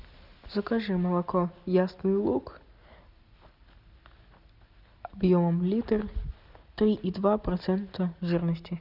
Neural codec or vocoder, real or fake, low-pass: none; real; 5.4 kHz